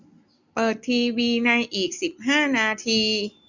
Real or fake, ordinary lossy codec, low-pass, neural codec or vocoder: real; none; 7.2 kHz; none